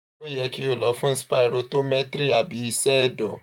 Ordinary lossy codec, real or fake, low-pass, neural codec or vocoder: none; fake; 19.8 kHz; vocoder, 44.1 kHz, 128 mel bands, Pupu-Vocoder